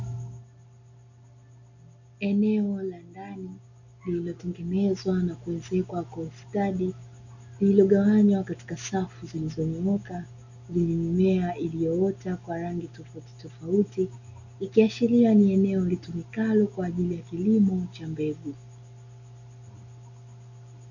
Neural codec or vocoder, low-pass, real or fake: none; 7.2 kHz; real